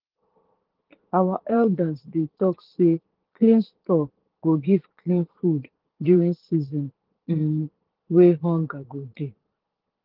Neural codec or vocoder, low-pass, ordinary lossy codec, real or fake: vocoder, 22.05 kHz, 80 mel bands, Vocos; 5.4 kHz; Opus, 16 kbps; fake